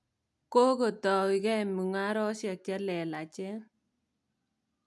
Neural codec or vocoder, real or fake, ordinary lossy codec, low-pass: none; real; none; none